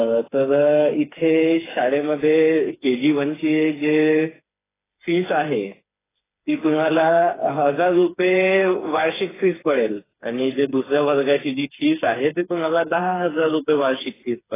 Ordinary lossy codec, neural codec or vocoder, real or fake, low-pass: AAC, 16 kbps; codec, 16 kHz, 4 kbps, FreqCodec, smaller model; fake; 3.6 kHz